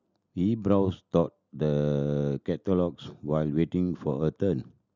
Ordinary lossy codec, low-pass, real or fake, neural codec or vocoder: none; 7.2 kHz; real; none